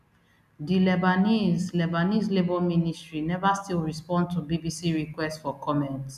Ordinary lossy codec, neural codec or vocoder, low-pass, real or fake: none; none; 14.4 kHz; real